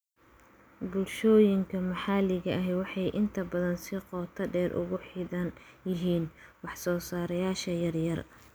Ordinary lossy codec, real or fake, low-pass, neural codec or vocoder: none; real; none; none